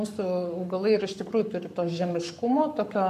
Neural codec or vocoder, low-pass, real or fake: codec, 44.1 kHz, 7.8 kbps, Pupu-Codec; 14.4 kHz; fake